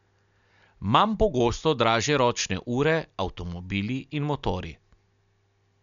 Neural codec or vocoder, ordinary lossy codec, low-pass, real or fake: none; none; 7.2 kHz; real